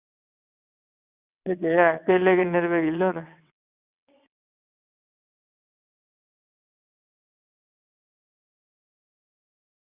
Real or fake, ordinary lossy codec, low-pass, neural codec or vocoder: fake; Opus, 64 kbps; 3.6 kHz; vocoder, 22.05 kHz, 80 mel bands, WaveNeXt